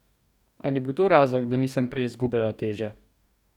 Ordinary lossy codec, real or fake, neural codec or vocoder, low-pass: none; fake; codec, 44.1 kHz, 2.6 kbps, DAC; 19.8 kHz